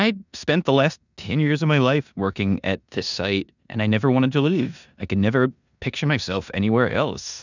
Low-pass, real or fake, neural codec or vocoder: 7.2 kHz; fake; codec, 16 kHz in and 24 kHz out, 0.9 kbps, LongCat-Audio-Codec, four codebook decoder